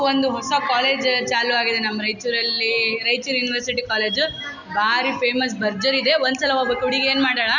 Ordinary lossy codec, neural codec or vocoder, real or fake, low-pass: none; none; real; 7.2 kHz